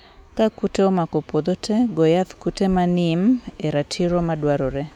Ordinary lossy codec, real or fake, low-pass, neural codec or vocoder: none; fake; 19.8 kHz; autoencoder, 48 kHz, 128 numbers a frame, DAC-VAE, trained on Japanese speech